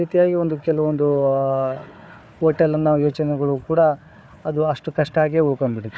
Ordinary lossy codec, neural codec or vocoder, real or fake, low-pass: none; codec, 16 kHz, 4 kbps, FunCodec, trained on Chinese and English, 50 frames a second; fake; none